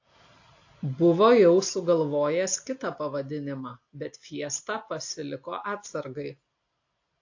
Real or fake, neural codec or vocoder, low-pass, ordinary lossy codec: real; none; 7.2 kHz; AAC, 48 kbps